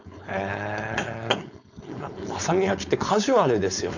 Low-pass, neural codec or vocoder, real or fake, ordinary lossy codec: 7.2 kHz; codec, 16 kHz, 4.8 kbps, FACodec; fake; none